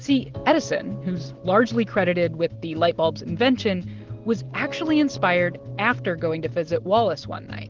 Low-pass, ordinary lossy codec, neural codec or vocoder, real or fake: 7.2 kHz; Opus, 16 kbps; none; real